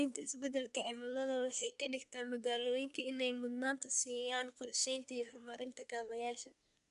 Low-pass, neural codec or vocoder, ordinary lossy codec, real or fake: 10.8 kHz; codec, 24 kHz, 1 kbps, SNAC; none; fake